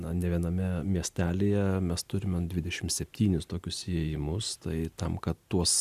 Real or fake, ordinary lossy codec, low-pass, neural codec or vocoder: real; Opus, 64 kbps; 14.4 kHz; none